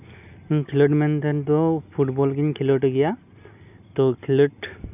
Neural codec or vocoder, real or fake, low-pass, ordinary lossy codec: none; real; 3.6 kHz; none